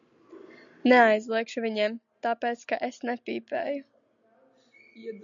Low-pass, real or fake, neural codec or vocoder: 7.2 kHz; real; none